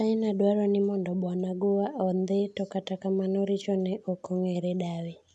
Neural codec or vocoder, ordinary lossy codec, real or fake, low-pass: none; none; real; none